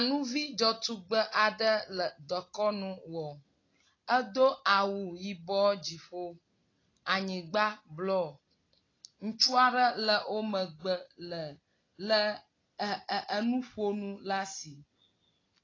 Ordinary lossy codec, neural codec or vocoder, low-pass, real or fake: AAC, 32 kbps; none; 7.2 kHz; real